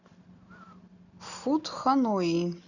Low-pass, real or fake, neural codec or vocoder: 7.2 kHz; real; none